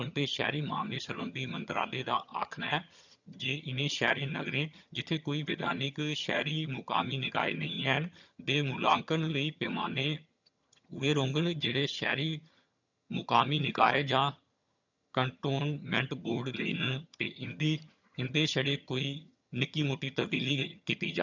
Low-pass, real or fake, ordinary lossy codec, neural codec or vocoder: 7.2 kHz; fake; none; vocoder, 22.05 kHz, 80 mel bands, HiFi-GAN